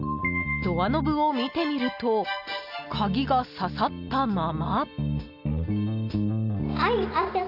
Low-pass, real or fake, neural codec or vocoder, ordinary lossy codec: 5.4 kHz; real; none; none